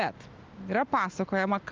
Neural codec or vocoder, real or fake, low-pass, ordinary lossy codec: none; real; 7.2 kHz; Opus, 24 kbps